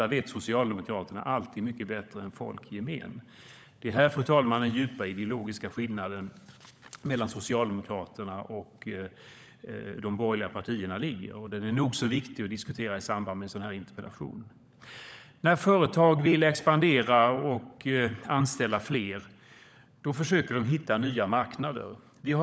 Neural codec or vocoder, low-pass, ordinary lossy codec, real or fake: codec, 16 kHz, 16 kbps, FunCodec, trained on LibriTTS, 50 frames a second; none; none; fake